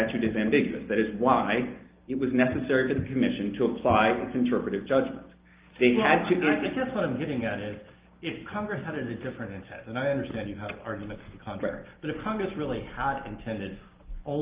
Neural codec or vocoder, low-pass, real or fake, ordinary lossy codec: none; 3.6 kHz; real; Opus, 24 kbps